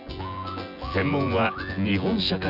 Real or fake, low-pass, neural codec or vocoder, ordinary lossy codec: fake; 5.4 kHz; vocoder, 24 kHz, 100 mel bands, Vocos; none